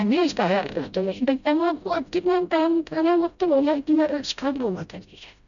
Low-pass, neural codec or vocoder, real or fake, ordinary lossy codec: 7.2 kHz; codec, 16 kHz, 0.5 kbps, FreqCodec, smaller model; fake; none